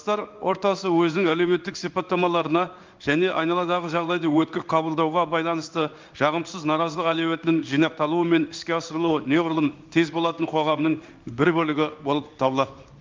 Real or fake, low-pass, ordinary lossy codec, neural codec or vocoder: fake; 7.2 kHz; Opus, 24 kbps; codec, 16 kHz in and 24 kHz out, 1 kbps, XY-Tokenizer